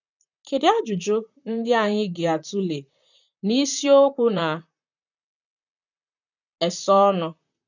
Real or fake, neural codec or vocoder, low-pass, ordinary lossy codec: fake; vocoder, 44.1 kHz, 128 mel bands, Pupu-Vocoder; 7.2 kHz; none